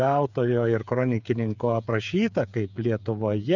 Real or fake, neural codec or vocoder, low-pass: fake; codec, 16 kHz, 8 kbps, FreqCodec, smaller model; 7.2 kHz